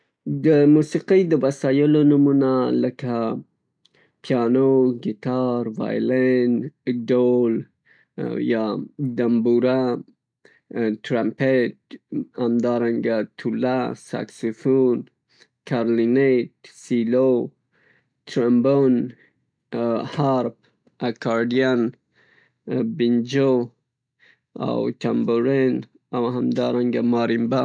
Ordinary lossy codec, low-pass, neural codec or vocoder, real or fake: none; 9.9 kHz; none; real